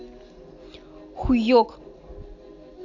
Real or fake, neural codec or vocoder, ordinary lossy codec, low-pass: real; none; none; 7.2 kHz